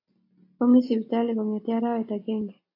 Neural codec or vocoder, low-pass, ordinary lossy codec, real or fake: none; 5.4 kHz; AAC, 32 kbps; real